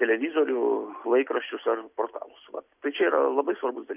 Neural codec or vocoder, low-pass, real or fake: none; 3.6 kHz; real